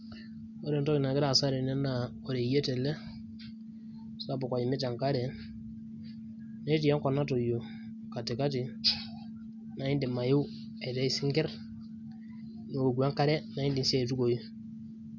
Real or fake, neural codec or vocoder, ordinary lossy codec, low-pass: real; none; none; 7.2 kHz